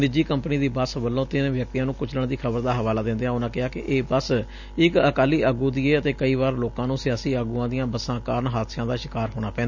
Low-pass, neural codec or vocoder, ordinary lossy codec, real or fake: 7.2 kHz; none; none; real